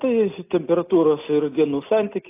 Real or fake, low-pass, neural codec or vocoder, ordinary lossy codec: real; 3.6 kHz; none; AAC, 24 kbps